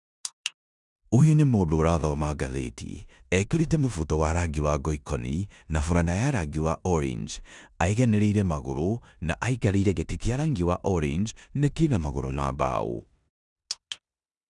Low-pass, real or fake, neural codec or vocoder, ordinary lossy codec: 10.8 kHz; fake; codec, 16 kHz in and 24 kHz out, 0.9 kbps, LongCat-Audio-Codec, four codebook decoder; none